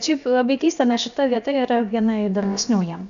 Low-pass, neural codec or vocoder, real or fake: 7.2 kHz; codec, 16 kHz, about 1 kbps, DyCAST, with the encoder's durations; fake